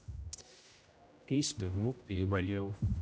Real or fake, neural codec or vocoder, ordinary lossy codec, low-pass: fake; codec, 16 kHz, 0.5 kbps, X-Codec, HuBERT features, trained on general audio; none; none